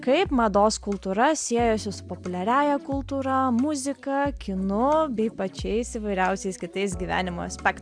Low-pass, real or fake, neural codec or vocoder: 9.9 kHz; real; none